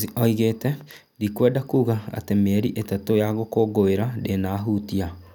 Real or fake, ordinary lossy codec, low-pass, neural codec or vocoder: real; none; 19.8 kHz; none